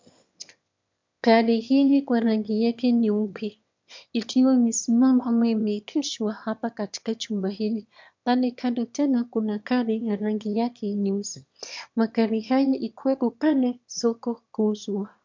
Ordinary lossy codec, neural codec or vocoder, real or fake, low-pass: MP3, 64 kbps; autoencoder, 22.05 kHz, a latent of 192 numbers a frame, VITS, trained on one speaker; fake; 7.2 kHz